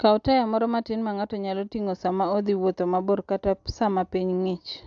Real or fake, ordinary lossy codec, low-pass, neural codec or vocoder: real; AAC, 64 kbps; 7.2 kHz; none